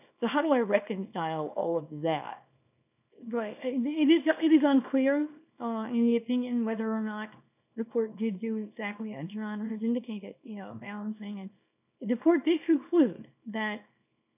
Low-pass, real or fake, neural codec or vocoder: 3.6 kHz; fake; codec, 24 kHz, 0.9 kbps, WavTokenizer, small release